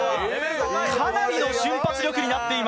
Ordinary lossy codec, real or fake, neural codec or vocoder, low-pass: none; real; none; none